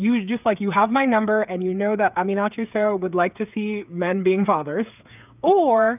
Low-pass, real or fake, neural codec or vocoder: 3.6 kHz; fake; codec, 16 kHz, 8 kbps, FreqCodec, smaller model